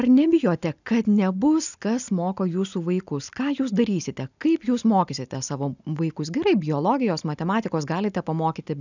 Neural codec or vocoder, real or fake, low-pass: none; real; 7.2 kHz